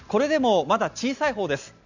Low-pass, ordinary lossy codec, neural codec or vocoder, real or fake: 7.2 kHz; none; none; real